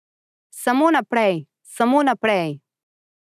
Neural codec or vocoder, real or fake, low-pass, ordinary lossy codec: none; real; 14.4 kHz; none